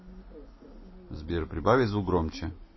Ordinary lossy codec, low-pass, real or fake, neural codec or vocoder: MP3, 24 kbps; 7.2 kHz; real; none